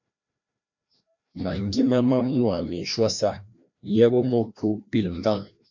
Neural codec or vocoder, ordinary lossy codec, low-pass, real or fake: codec, 16 kHz, 1 kbps, FreqCodec, larger model; MP3, 64 kbps; 7.2 kHz; fake